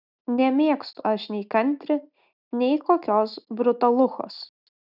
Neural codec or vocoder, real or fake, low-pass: none; real; 5.4 kHz